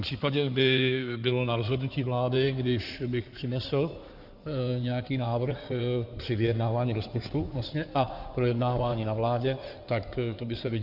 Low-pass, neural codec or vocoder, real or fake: 5.4 kHz; codec, 16 kHz in and 24 kHz out, 2.2 kbps, FireRedTTS-2 codec; fake